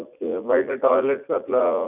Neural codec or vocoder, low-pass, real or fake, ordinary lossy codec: vocoder, 44.1 kHz, 80 mel bands, Vocos; 3.6 kHz; fake; none